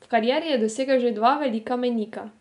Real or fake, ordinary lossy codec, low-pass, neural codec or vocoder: real; none; 10.8 kHz; none